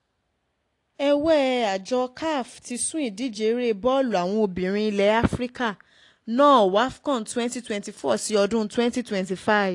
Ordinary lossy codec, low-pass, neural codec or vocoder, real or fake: AAC, 48 kbps; 10.8 kHz; none; real